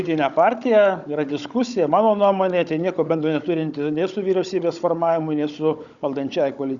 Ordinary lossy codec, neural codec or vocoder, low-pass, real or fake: Opus, 64 kbps; codec, 16 kHz, 16 kbps, FunCodec, trained on Chinese and English, 50 frames a second; 7.2 kHz; fake